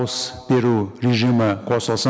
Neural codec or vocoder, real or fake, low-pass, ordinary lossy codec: none; real; none; none